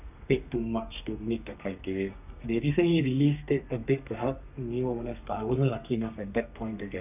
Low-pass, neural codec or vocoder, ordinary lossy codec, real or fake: 3.6 kHz; codec, 32 kHz, 1.9 kbps, SNAC; none; fake